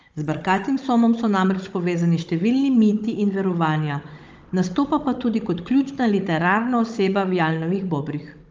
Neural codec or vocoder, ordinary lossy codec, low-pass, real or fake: codec, 16 kHz, 16 kbps, FunCodec, trained on Chinese and English, 50 frames a second; Opus, 24 kbps; 7.2 kHz; fake